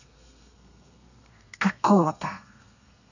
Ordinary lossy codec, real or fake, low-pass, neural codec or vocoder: none; fake; 7.2 kHz; codec, 32 kHz, 1.9 kbps, SNAC